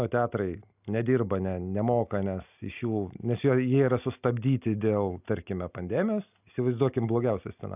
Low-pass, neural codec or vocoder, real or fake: 3.6 kHz; none; real